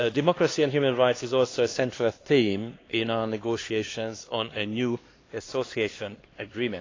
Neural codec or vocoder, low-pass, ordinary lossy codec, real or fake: codec, 16 kHz, 2 kbps, X-Codec, HuBERT features, trained on LibriSpeech; 7.2 kHz; AAC, 32 kbps; fake